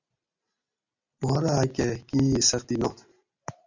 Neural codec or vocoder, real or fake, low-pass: none; real; 7.2 kHz